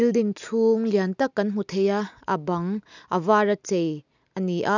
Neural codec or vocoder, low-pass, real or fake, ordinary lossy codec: none; 7.2 kHz; real; none